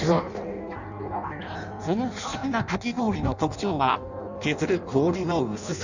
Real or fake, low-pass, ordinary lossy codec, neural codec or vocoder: fake; 7.2 kHz; none; codec, 16 kHz in and 24 kHz out, 0.6 kbps, FireRedTTS-2 codec